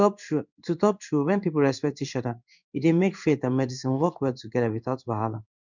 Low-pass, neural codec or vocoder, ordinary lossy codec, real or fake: 7.2 kHz; codec, 16 kHz in and 24 kHz out, 1 kbps, XY-Tokenizer; none; fake